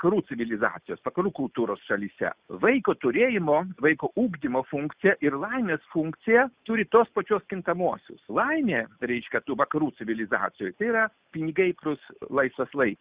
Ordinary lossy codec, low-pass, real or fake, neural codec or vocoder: Opus, 64 kbps; 3.6 kHz; real; none